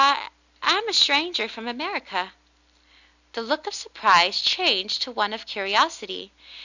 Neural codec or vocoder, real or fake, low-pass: none; real; 7.2 kHz